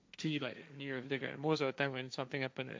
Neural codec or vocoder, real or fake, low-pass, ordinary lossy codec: codec, 16 kHz, 1.1 kbps, Voila-Tokenizer; fake; none; none